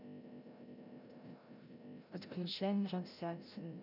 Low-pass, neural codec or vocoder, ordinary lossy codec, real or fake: 5.4 kHz; codec, 16 kHz, 0.5 kbps, FreqCodec, larger model; none; fake